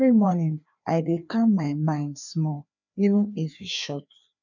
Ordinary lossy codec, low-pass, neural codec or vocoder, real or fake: none; 7.2 kHz; codec, 16 kHz, 2 kbps, FreqCodec, larger model; fake